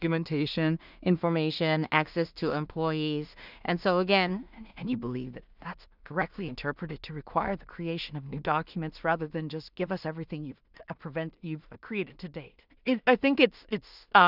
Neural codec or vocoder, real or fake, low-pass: codec, 16 kHz in and 24 kHz out, 0.4 kbps, LongCat-Audio-Codec, two codebook decoder; fake; 5.4 kHz